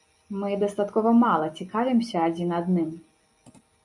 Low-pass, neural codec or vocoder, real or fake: 10.8 kHz; none; real